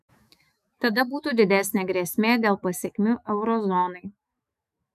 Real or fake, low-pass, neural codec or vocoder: fake; 14.4 kHz; autoencoder, 48 kHz, 128 numbers a frame, DAC-VAE, trained on Japanese speech